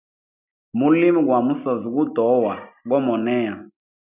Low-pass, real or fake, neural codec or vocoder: 3.6 kHz; real; none